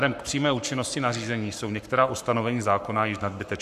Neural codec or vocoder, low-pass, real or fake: codec, 44.1 kHz, 7.8 kbps, Pupu-Codec; 14.4 kHz; fake